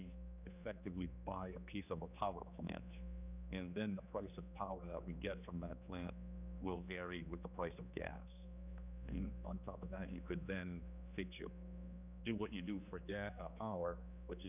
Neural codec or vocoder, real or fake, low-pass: codec, 16 kHz, 2 kbps, X-Codec, HuBERT features, trained on general audio; fake; 3.6 kHz